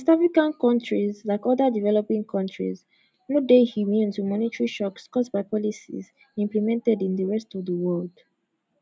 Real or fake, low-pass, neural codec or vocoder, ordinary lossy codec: real; none; none; none